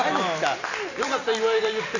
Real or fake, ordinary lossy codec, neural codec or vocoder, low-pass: real; none; none; 7.2 kHz